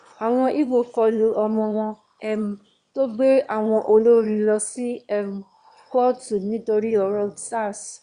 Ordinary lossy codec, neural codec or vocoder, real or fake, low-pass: Opus, 64 kbps; autoencoder, 22.05 kHz, a latent of 192 numbers a frame, VITS, trained on one speaker; fake; 9.9 kHz